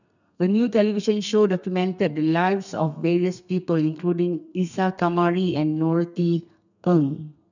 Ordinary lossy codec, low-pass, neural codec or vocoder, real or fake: none; 7.2 kHz; codec, 32 kHz, 1.9 kbps, SNAC; fake